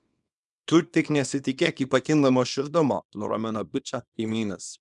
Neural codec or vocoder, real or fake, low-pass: codec, 24 kHz, 0.9 kbps, WavTokenizer, small release; fake; 10.8 kHz